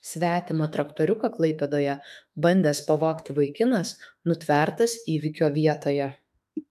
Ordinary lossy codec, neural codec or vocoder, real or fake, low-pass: AAC, 96 kbps; autoencoder, 48 kHz, 32 numbers a frame, DAC-VAE, trained on Japanese speech; fake; 14.4 kHz